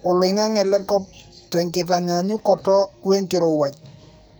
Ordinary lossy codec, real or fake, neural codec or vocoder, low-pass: none; fake; codec, 44.1 kHz, 2.6 kbps, SNAC; none